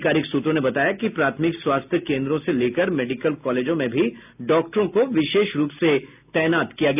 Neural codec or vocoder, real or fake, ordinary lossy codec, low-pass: none; real; none; 3.6 kHz